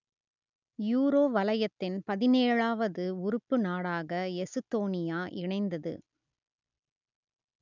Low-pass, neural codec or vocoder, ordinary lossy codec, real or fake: 7.2 kHz; none; none; real